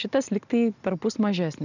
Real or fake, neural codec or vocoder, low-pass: real; none; 7.2 kHz